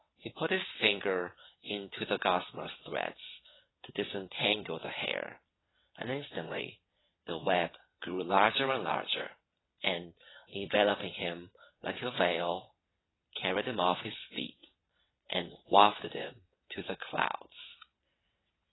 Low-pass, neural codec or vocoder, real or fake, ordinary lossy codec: 7.2 kHz; vocoder, 22.05 kHz, 80 mel bands, WaveNeXt; fake; AAC, 16 kbps